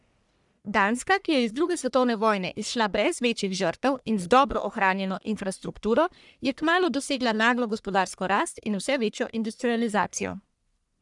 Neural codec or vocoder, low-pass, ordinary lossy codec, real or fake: codec, 44.1 kHz, 1.7 kbps, Pupu-Codec; 10.8 kHz; none; fake